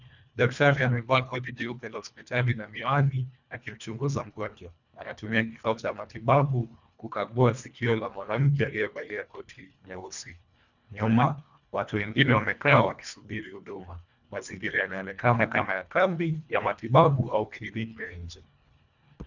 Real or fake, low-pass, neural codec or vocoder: fake; 7.2 kHz; codec, 24 kHz, 1.5 kbps, HILCodec